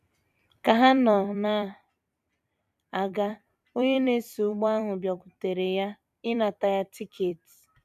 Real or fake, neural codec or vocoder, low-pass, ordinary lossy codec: fake; vocoder, 44.1 kHz, 128 mel bands every 256 samples, BigVGAN v2; 14.4 kHz; none